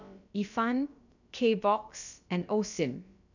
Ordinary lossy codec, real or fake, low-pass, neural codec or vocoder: none; fake; 7.2 kHz; codec, 16 kHz, about 1 kbps, DyCAST, with the encoder's durations